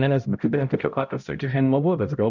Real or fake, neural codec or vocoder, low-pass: fake; codec, 16 kHz, 0.5 kbps, X-Codec, HuBERT features, trained on balanced general audio; 7.2 kHz